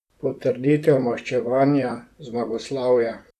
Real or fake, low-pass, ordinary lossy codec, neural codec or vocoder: fake; 14.4 kHz; none; vocoder, 44.1 kHz, 128 mel bands, Pupu-Vocoder